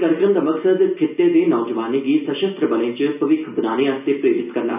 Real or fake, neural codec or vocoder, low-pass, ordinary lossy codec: real; none; 3.6 kHz; none